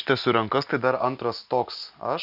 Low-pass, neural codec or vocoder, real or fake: 5.4 kHz; none; real